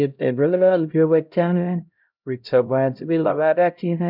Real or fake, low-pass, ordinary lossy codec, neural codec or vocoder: fake; 5.4 kHz; none; codec, 16 kHz, 0.5 kbps, X-Codec, HuBERT features, trained on LibriSpeech